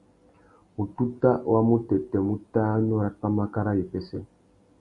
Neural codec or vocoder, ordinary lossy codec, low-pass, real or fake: none; MP3, 96 kbps; 10.8 kHz; real